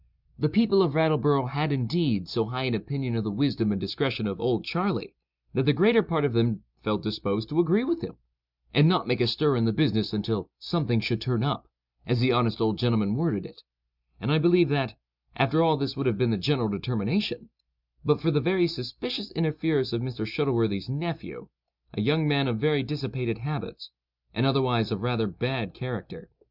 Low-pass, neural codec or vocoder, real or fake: 5.4 kHz; none; real